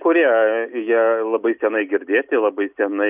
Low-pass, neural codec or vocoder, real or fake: 3.6 kHz; none; real